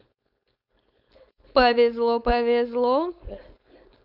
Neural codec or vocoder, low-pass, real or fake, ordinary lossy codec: codec, 16 kHz, 4.8 kbps, FACodec; 5.4 kHz; fake; none